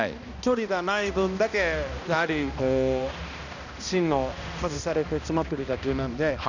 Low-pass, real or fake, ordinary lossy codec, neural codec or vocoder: 7.2 kHz; fake; none; codec, 16 kHz, 1 kbps, X-Codec, HuBERT features, trained on balanced general audio